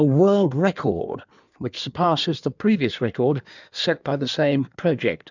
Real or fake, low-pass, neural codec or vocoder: fake; 7.2 kHz; codec, 16 kHz in and 24 kHz out, 1.1 kbps, FireRedTTS-2 codec